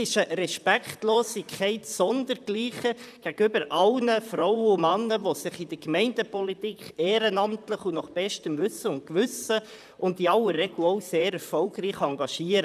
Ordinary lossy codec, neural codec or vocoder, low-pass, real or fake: none; vocoder, 44.1 kHz, 128 mel bands, Pupu-Vocoder; 14.4 kHz; fake